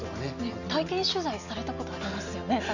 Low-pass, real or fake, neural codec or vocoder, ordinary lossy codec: 7.2 kHz; real; none; none